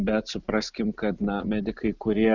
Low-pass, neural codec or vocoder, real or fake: 7.2 kHz; none; real